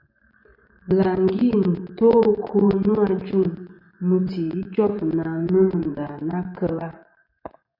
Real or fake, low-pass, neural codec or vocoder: fake; 5.4 kHz; vocoder, 24 kHz, 100 mel bands, Vocos